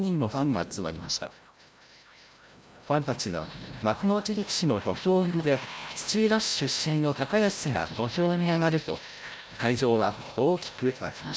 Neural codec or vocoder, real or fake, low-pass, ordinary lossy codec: codec, 16 kHz, 0.5 kbps, FreqCodec, larger model; fake; none; none